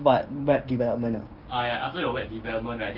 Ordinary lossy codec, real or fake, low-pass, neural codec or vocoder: Opus, 32 kbps; fake; 5.4 kHz; codec, 16 kHz, 6 kbps, DAC